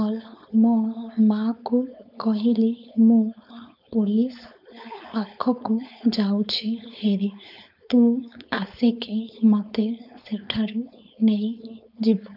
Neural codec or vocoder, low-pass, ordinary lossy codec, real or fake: codec, 16 kHz, 4.8 kbps, FACodec; 5.4 kHz; none; fake